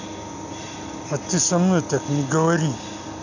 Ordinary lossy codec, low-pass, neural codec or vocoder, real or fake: none; 7.2 kHz; none; real